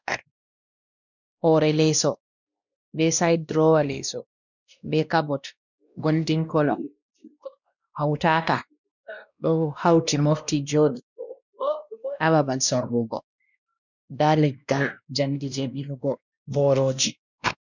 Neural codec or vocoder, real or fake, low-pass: codec, 16 kHz, 1 kbps, X-Codec, WavLM features, trained on Multilingual LibriSpeech; fake; 7.2 kHz